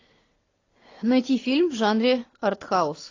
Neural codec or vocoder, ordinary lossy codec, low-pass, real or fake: none; AAC, 32 kbps; 7.2 kHz; real